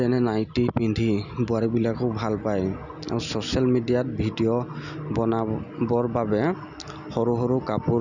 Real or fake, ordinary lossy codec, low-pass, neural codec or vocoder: real; none; 7.2 kHz; none